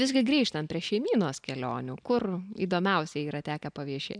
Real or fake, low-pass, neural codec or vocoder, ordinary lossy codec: real; 9.9 kHz; none; MP3, 96 kbps